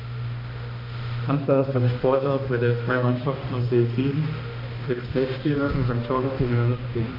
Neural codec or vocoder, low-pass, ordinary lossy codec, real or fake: codec, 16 kHz, 1 kbps, X-Codec, HuBERT features, trained on balanced general audio; 5.4 kHz; AAC, 48 kbps; fake